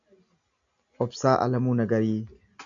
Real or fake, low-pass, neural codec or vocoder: real; 7.2 kHz; none